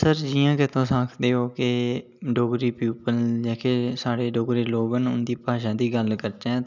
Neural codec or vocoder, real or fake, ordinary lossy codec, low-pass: none; real; none; 7.2 kHz